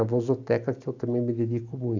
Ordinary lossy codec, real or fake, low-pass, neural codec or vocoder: AAC, 48 kbps; real; 7.2 kHz; none